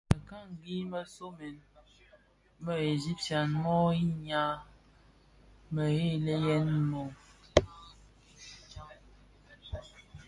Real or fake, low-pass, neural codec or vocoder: real; 10.8 kHz; none